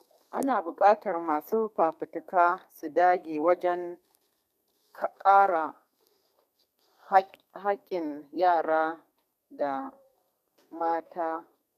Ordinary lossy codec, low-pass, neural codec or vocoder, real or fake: none; 14.4 kHz; codec, 32 kHz, 1.9 kbps, SNAC; fake